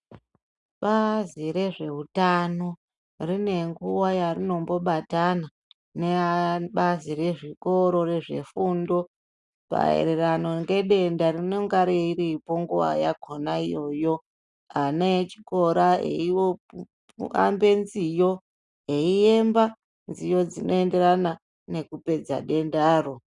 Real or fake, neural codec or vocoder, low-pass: real; none; 10.8 kHz